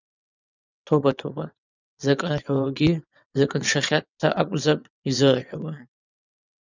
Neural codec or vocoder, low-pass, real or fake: vocoder, 22.05 kHz, 80 mel bands, WaveNeXt; 7.2 kHz; fake